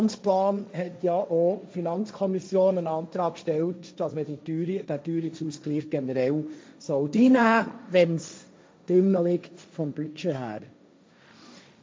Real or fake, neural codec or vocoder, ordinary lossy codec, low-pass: fake; codec, 16 kHz, 1.1 kbps, Voila-Tokenizer; none; none